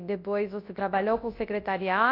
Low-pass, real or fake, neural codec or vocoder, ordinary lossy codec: 5.4 kHz; fake; codec, 24 kHz, 0.9 kbps, WavTokenizer, large speech release; AAC, 32 kbps